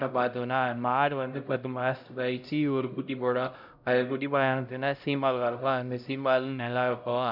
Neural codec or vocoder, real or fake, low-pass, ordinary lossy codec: codec, 16 kHz, 0.5 kbps, X-Codec, HuBERT features, trained on LibriSpeech; fake; 5.4 kHz; none